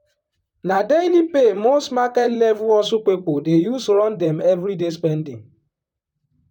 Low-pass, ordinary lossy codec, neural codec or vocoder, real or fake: 19.8 kHz; none; vocoder, 44.1 kHz, 128 mel bands, Pupu-Vocoder; fake